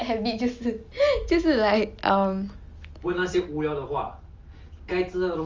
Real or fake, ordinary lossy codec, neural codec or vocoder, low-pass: real; Opus, 32 kbps; none; 7.2 kHz